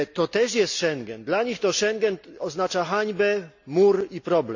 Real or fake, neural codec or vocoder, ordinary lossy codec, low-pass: real; none; none; 7.2 kHz